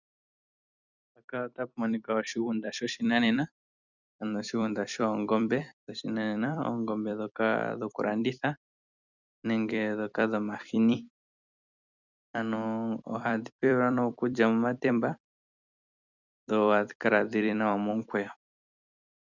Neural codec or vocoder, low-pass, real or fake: vocoder, 44.1 kHz, 128 mel bands every 256 samples, BigVGAN v2; 7.2 kHz; fake